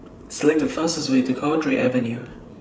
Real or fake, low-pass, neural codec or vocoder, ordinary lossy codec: fake; none; codec, 16 kHz, 8 kbps, FreqCodec, larger model; none